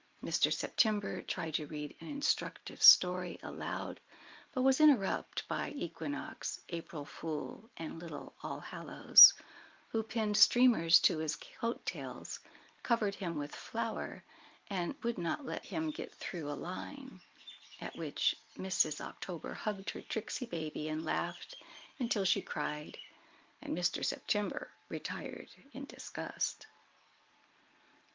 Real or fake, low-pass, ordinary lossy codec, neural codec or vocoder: real; 7.2 kHz; Opus, 32 kbps; none